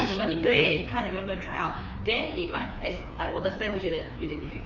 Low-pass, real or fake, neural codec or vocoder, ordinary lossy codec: 7.2 kHz; fake; codec, 16 kHz, 2 kbps, FreqCodec, larger model; none